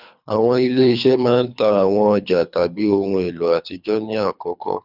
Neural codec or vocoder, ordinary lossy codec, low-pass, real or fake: codec, 24 kHz, 3 kbps, HILCodec; none; 5.4 kHz; fake